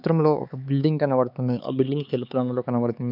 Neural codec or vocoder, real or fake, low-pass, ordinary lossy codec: codec, 16 kHz, 4 kbps, X-Codec, HuBERT features, trained on LibriSpeech; fake; 5.4 kHz; none